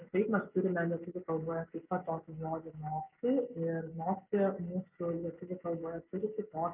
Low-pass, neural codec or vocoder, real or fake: 3.6 kHz; none; real